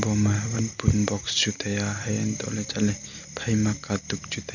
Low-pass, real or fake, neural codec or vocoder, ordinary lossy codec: 7.2 kHz; real; none; none